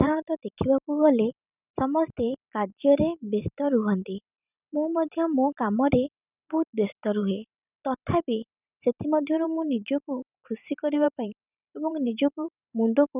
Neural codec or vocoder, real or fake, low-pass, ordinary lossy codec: vocoder, 44.1 kHz, 128 mel bands every 512 samples, BigVGAN v2; fake; 3.6 kHz; none